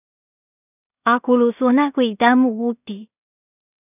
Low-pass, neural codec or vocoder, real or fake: 3.6 kHz; codec, 16 kHz in and 24 kHz out, 0.4 kbps, LongCat-Audio-Codec, two codebook decoder; fake